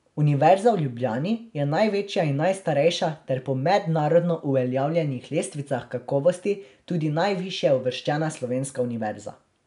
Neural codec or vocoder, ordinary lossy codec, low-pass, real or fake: none; none; 10.8 kHz; real